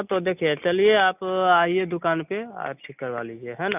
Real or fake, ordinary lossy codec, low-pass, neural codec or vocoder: real; none; 3.6 kHz; none